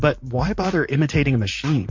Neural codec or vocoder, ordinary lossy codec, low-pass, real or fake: vocoder, 44.1 kHz, 128 mel bands, Pupu-Vocoder; MP3, 48 kbps; 7.2 kHz; fake